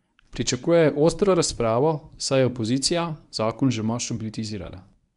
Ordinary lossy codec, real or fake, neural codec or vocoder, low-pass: none; fake; codec, 24 kHz, 0.9 kbps, WavTokenizer, medium speech release version 1; 10.8 kHz